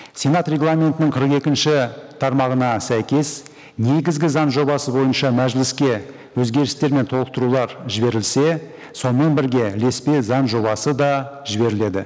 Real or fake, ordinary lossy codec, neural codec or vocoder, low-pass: real; none; none; none